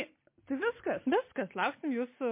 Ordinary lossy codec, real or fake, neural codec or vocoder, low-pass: MP3, 24 kbps; real; none; 3.6 kHz